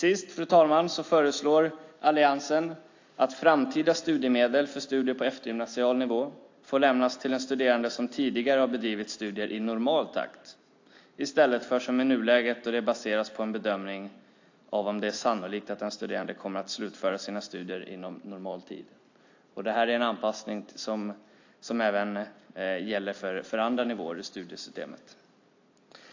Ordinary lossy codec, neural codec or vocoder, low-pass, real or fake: AAC, 48 kbps; none; 7.2 kHz; real